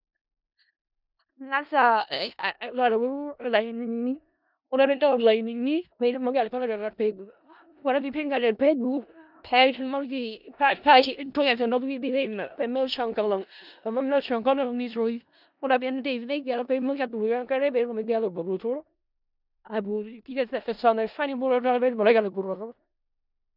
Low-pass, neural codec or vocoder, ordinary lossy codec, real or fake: 5.4 kHz; codec, 16 kHz in and 24 kHz out, 0.4 kbps, LongCat-Audio-Codec, four codebook decoder; none; fake